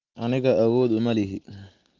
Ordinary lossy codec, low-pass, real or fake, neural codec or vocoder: Opus, 24 kbps; 7.2 kHz; real; none